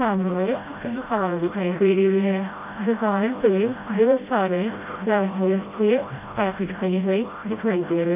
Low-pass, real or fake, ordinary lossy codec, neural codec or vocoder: 3.6 kHz; fake; MP3, 32 kbps; codec, 16 kHz, 0.5 kbps, FreqCodec, smaller model